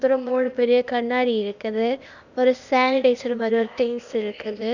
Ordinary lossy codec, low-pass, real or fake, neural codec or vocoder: none; 7.2 kHz; fake; codec, 16 kHz, 0.8 kbps, ZipCodec